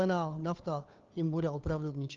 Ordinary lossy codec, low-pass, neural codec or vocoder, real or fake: Opus, 32 kbps; 7.2 kHz; codec, 16 kHz, 2 kbps, FunCodec, trained on Chinese and English, 25 frames a second; fake